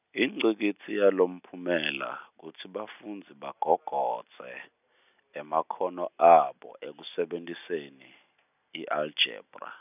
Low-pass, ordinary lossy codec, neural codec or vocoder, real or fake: 3.6 kHz; none; none; real